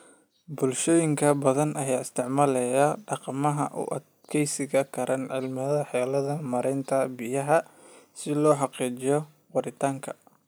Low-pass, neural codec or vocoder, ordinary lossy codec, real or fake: none; none; none; real